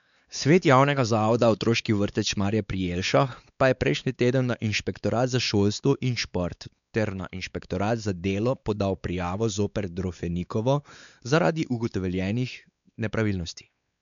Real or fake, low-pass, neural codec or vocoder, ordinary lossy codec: fake; 7.2 kHz; codec, 16 kHz, 4 kbps, X-Codec, WavLM features, trained on Multilingual LibriSpeech; none